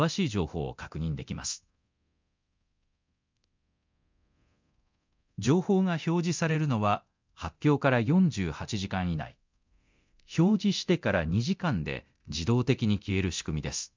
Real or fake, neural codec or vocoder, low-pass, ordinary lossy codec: fake; codec, 24 kHz, 0.9 kbps, DualCodec; 7.2 kHz; MP3, 64 kbps